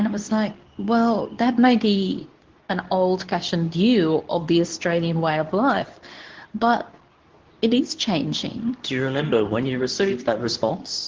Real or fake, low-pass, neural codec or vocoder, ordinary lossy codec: fake; 7.2 kHz; codec, 24 kHz, 0.9 kbps, WavTokenizer, medium speech release version 2; Opus, 16 kbps